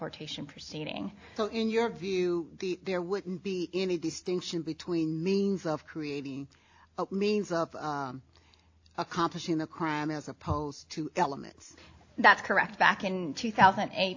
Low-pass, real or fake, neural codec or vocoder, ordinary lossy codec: 7.2 kHz; real; none; AAC, 48 kbps